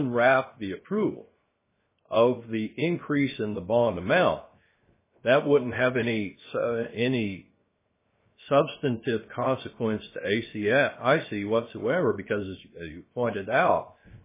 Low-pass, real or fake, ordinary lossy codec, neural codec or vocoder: 3.6 kHz; fake; MP3, 16 kbps; codec, 16 kHz, 0.7 kbps, FocalCodec